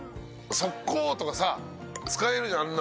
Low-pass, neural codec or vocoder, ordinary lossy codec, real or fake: none; none; none; real